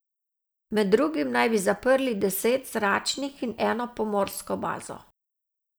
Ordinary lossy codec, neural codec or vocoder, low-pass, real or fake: none; none; none; real